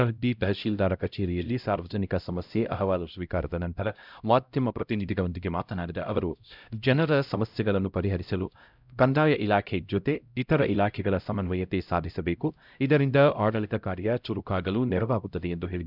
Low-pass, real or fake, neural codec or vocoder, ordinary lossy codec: 5.4 kHz; fake; codec, 16 kHz, 0.5 kbps, X-Codec, HuBERT features, trained on LibriSpeech; none